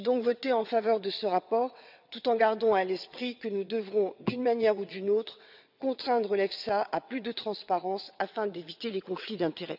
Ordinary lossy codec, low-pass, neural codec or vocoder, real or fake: none; 5.4 kHz; vocoder, 22.05 kHz, 80 mel bands, WaveNeXt; fake